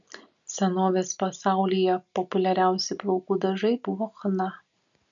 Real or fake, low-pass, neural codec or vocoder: real; 7.2 kHz; none